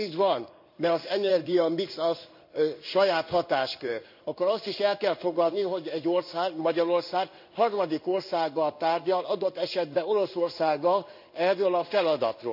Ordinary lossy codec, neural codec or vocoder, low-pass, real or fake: none; codec, 16 kHz in and 24 kHz out, 1 kbps, XY-Tokenizer; 5.4 kHz; fake